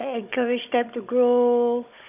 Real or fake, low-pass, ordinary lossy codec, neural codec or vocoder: fake; 3.6 kHz; none; codec, 16 kHz, 16 kbps, FunCodec, trained on Chinese and English, 50 frames a second